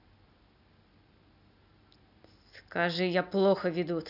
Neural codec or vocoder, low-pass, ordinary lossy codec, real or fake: none; 5.4 kHz; none; real